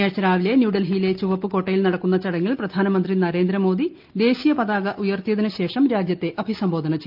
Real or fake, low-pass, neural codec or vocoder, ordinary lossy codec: real; 5.4 kHz; none; Opus, 24 kbps